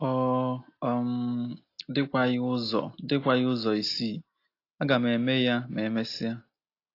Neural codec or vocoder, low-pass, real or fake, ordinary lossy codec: none; 5.4 kHz; real; AAC, 32 kbps